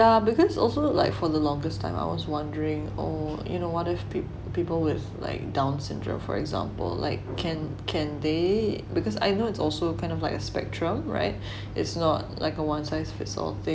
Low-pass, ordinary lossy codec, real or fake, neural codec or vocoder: none; none; real; none